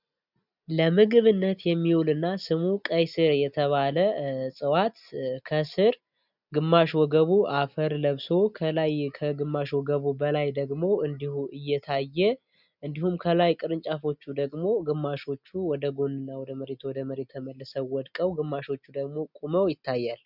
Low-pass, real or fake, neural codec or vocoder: 5.4 kHz; real; none